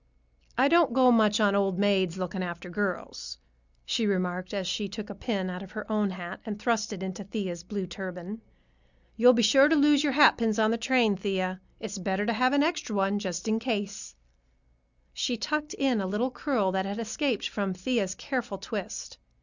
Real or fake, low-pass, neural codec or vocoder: real; 7.2 kHz; none